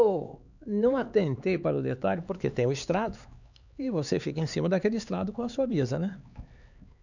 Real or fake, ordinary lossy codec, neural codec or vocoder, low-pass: fake; none; codec, 16 kHz, 4 kbps, X-Codec, HuBERT features, trained on LibriSpeech; 7.2 kHz